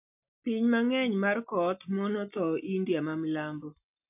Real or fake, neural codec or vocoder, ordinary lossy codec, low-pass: real; none; AAC, 32 kbps; 3.6 kHz